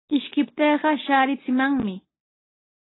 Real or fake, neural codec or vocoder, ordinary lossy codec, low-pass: real; none; AAC, 16 kbps; 7.2 kHz